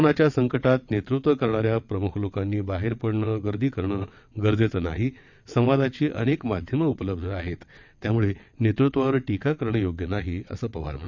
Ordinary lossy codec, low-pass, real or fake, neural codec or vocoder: none; 7.2 kHz; fake; vocoder, 22.05 kHz, 80 mel bands, WaveNeXt